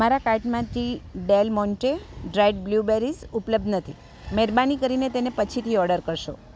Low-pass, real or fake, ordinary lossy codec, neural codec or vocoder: none; real; none; none